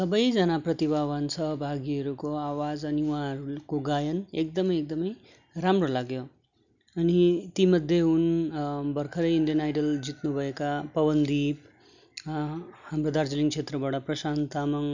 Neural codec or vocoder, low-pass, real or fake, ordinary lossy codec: none; 7.2 kHz; real; none